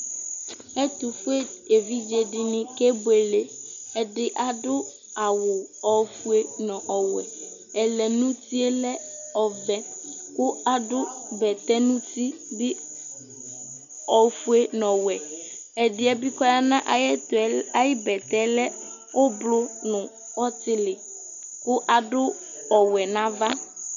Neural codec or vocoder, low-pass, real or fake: none; 7.2 kHz; real